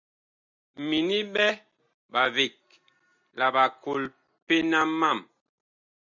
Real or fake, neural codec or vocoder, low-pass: real; none; 7.2 kHz